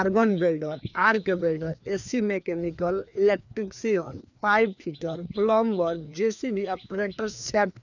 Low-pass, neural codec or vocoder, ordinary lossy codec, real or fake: 7.2 kHz; codec, 16 kHz, 2 kbps, FreqCodec, larger model; none; fake